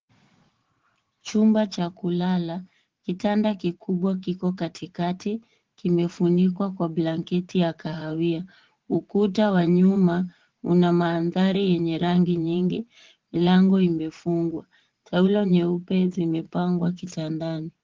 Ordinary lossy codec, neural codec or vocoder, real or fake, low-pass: Opus, 16 kbps; vocoder, 44.1 kHz, 80 mel bands, Vocos; fake; 7.2 kHz